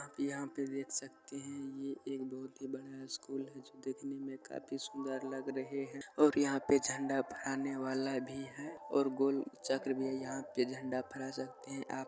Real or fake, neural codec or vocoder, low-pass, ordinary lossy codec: real; none; none; none